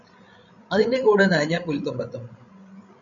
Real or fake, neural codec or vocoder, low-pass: fake; codec, 16 kHz, 16 kbps, FreqCodec, larger model; 7.2 kHz